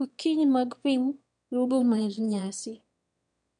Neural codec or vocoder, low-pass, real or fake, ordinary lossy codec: autoencoder, 22.05 kHz, a latent of 192 numbers a frame, VITS, trained on one speaker; 9.9 kHz; fake; MP3, 96 kbps